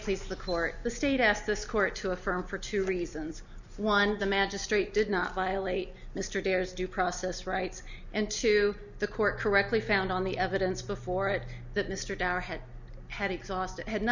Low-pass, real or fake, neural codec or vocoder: 7.2 kHz; real; none